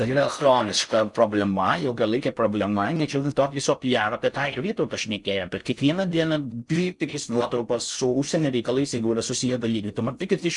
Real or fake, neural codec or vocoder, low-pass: fake; codec, 16 kHz in and 24 kHz out, 0.6 kbps, FocalCodec, streaming, 4096 codes; 10.8 kHz